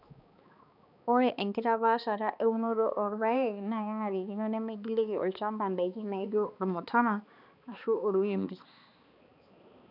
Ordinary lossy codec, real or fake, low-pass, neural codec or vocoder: none; fake; 5.4 kHz; codec, 16 kHz, 2 kbps, X-Codec, HuBERT features, trained on balanced general audio